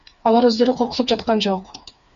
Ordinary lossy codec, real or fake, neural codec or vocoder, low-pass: Opus, 64 kbps; fake; codec, 16 kHz, 4 kbps, FreqCodec, smaller model; 7.2 kHz